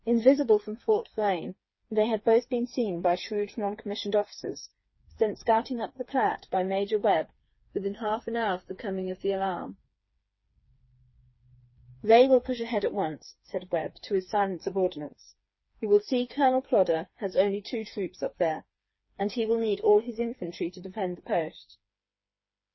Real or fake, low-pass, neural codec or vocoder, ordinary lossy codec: fake; 7.2 kHz; codec, 16 kHz, 4 kbps, FreqCodec, smaller model; MP3, 24 kbps